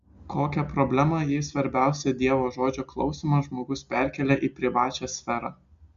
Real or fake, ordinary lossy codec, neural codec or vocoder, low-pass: real; Opus, 64 kbps; none; 7.2 kHz